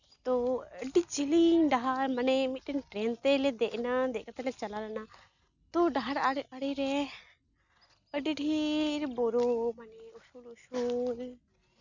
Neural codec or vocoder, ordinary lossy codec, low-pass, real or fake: none; none; 7.2 kHz; real